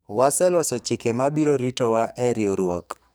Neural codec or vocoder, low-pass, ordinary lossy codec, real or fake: codec, 44.1 kHz, 2.6 kbps, SNAC; none; none; fake